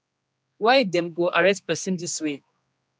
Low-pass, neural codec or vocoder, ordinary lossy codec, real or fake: none; codec, 16 kHz, 2 kbps, X-Codec, HuBERT features, trained on general audio; none; fake